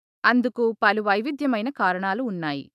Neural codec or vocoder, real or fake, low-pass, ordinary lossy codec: autoencoder, 48 kHz, 128 numbers a frame, DAC-VAE, trained on Japanese speech; fake; 14.4 kHz; AAC, 96 kbps